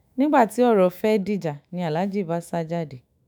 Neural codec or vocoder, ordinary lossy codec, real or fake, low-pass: autoencoder, 48 kHz, 128 numbers a frame, DAC-VAE, trained on Japanese speech; none; fake; none